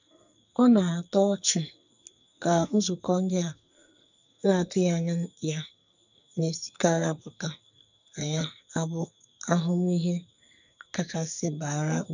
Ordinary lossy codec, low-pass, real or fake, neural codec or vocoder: none; 7.2 kHz; fake; codec, 44.1 kHz, 2.6 kbps, SNAC